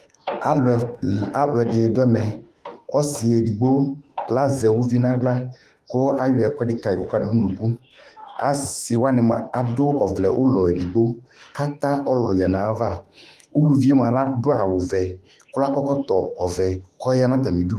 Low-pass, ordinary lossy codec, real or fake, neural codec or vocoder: 14.4 kHz; Opus, 32 kbps; fake; autoencoder, 48 kHz, 32 numbers a frame, DAC-VAE, trained on Japanese speech